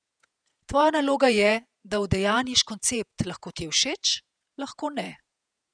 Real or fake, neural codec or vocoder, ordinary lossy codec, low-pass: fake; vocoder, 48 kHz, 128 mel bands, Vocos; none; 9.9 kHz